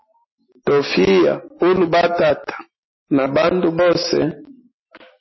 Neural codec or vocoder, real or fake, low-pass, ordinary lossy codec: none; real; 7.2 kHz; MP3, 24 kbps